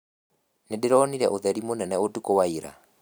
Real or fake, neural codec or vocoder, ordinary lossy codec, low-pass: real; none; none; none